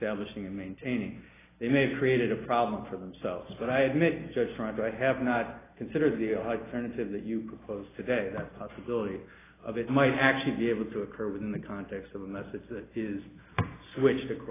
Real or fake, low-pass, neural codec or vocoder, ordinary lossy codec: real; 3.6 kHz; none; AAC, 16 kbps